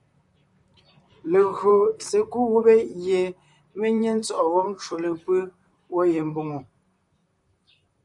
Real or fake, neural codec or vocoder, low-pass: fake; vocoder, 44.1 kHz, 128 mel bands, Pupu-Vocoder; 10.8 kHz